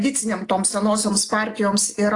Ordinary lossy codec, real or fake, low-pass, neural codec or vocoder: AAC, 48 kbps; real; 10.8 kHz; none